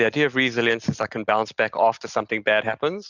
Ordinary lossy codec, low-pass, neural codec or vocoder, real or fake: Opus, 64 kbps; 7.2 kHz; none; real